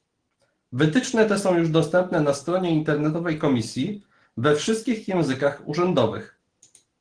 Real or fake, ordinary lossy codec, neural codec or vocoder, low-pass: real; Opus, 16 kbps; none; 9.9 kHz